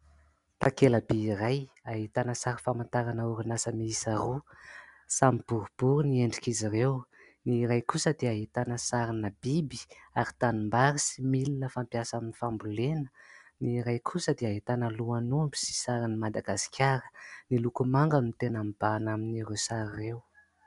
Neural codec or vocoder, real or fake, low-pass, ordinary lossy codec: none; real; 10.8 kHz; MP3, 96 kbps